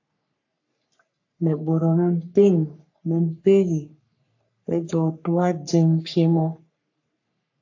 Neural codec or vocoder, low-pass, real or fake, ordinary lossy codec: codec, 44.1 kHz, 3.4 kbps, Pupu-Codec; 7.2 kHz; fake; AAC, 48 kbps